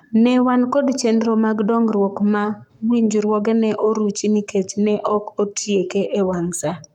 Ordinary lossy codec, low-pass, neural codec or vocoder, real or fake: none; 19.8 kHz; codec, 44.1 kHz, 7.8 kbps, Pupu-Codec; fake